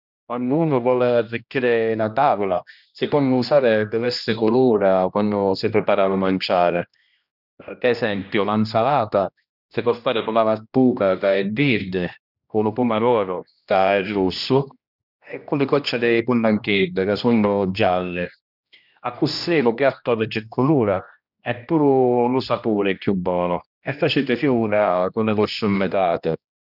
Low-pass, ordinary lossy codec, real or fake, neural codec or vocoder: 5.4 kHz; none; fake; codec, 16 kHz, 1 kbps, X-Codec, HuBERT features, trained on general audio